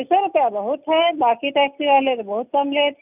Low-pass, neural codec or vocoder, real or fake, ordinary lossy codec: 3.6 kHz; none; real; none